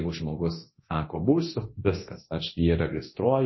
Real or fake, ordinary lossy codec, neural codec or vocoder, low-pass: fake; MP3, 24 kbps; codec, 24 kHz, 0.9 kbps, DualCodec; 7.2 kHz